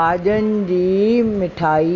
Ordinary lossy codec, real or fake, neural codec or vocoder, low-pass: Opus, 64 kbps; real; none; 7.2 kHz